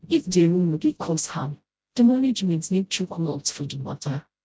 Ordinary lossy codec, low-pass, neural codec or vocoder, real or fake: none; none; codec, 16 kHz, 0.5 kbps, FreqCodec, smaller model; fake